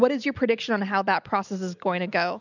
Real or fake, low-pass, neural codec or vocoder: real; 7.2 kHz; none